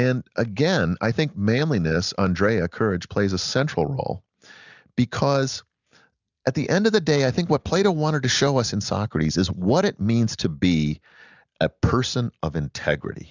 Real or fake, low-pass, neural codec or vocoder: real; 7.2 kHz; none